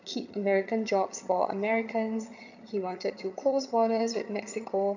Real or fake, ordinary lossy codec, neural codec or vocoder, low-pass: fake; none; vocoder, 22.05 kHz, 80 mel bands, HiFi-GAN; 7.2 kHz